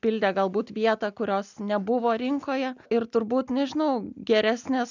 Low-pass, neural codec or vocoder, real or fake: 7.2 kHz; none; real